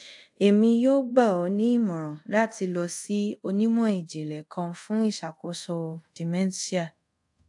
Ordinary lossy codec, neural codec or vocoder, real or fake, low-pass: AAC, 64 kbps; codec, 24 kHz, 0.5 kbps, DualCodec; fake; 10.8 kHz